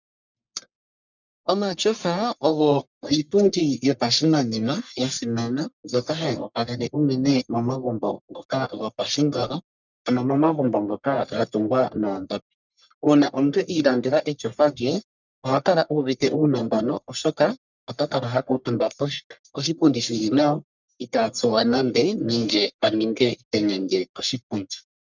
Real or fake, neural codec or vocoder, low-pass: fake; codec, 44.1 kHz, 1.7 kbps, Pupu-Codec; 7.2 kHz